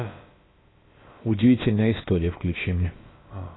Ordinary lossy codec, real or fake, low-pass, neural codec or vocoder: AAC, 16 kbps; fake; 7.2 kHz; codec, 16 kHz, about 1 kbps, DyCAST, with the encoder's durations